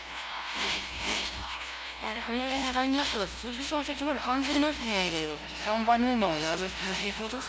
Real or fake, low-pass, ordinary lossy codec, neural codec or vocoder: fake; none; none; codec, 16 kHz, 0.5 kbps, FunCodec, trained on LibriTTS, 25 frames a second